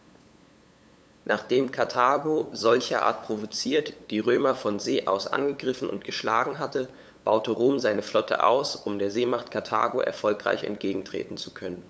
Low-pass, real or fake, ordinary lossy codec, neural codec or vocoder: none; fake; none; codec, 16 kHz, 8 kbps, FunCodec, trained on LibriTTS, 25 frames a second